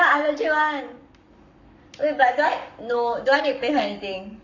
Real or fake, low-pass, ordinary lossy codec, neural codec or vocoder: fake; 7.2 kHz; none; codec, 44.1 kHz, 7.8 kbps, Pupu-Codec